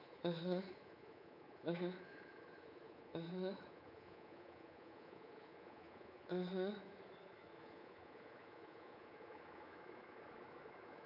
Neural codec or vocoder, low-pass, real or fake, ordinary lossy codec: codec, 24 kHz, 3.1 kbps, DualCodec; 5.4 kHz; fake; none